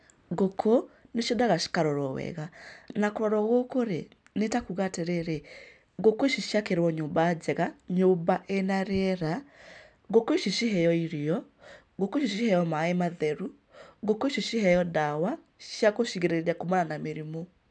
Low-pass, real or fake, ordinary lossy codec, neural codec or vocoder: 9.9 kHz; real; none; none